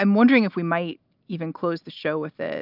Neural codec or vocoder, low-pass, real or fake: none; 5.4 kHz; real